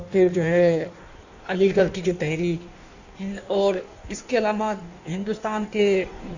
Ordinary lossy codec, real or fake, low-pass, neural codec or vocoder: none; fake; 7.2 kHz; codec, 16 kHz in and 24 kHz out, 1.1 kbps, FireRedTTS-2 codec